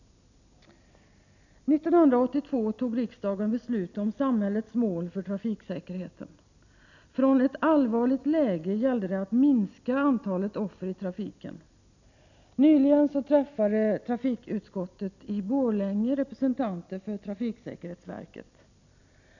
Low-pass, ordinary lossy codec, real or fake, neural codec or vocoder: 7.2 kHz; none; real; none